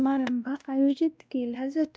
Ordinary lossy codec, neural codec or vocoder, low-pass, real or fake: none; codec, 16 kHz, 1 kbps, X-Codec, WavLM features, trained on Multilingual LibriSpeech; none; fake